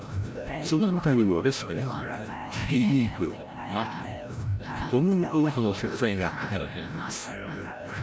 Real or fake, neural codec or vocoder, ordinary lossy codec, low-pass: fake; codec, 16 kHz, 0.5 kbps, FreqCodec, larger model; none; none